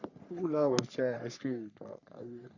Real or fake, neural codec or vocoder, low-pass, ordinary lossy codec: fake; codec, 44.1 kHz, 3.4 kbps, Pupu-Codec; 7.2 kHz; none